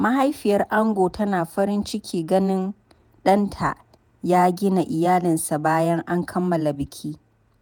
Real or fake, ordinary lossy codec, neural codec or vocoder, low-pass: fake; none; vocoder, 48 kHz, 128 mel bands, Vocos; none